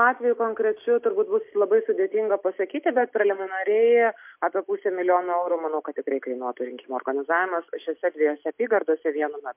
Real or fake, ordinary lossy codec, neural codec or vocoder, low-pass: real; MP3, 32 kbps; none; 3.6 kHz